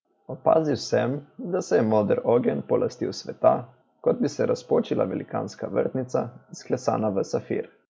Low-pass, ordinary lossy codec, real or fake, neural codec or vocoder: none; none; real; none